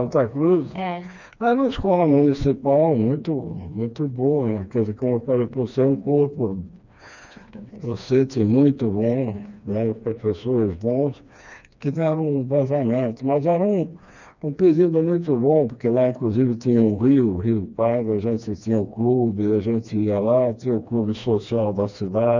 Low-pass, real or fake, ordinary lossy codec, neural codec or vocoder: 7.2 kHz; fake; none; codec, 16 kHz, 2 kbps, FreqCodec, smaller model